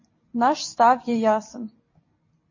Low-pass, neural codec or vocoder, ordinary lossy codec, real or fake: 7.2 kHz; vocoder, 44.1 kHz, 128 mel bands every 256 samples, BigVGAN v2; MP3, 32 kbps; fake